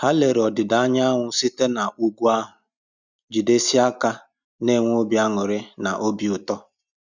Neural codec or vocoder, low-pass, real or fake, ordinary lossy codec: none; 7.2 kHz; real; none